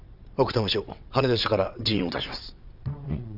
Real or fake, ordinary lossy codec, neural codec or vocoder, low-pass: fake; none; vocoder, 22.05 kHz, 80 mel bands, WaveNeXt; 5.4 kHz